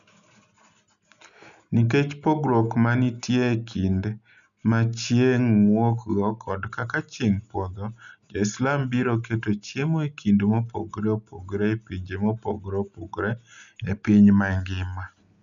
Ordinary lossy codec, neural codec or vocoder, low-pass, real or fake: none; none; 7.2 kHz; real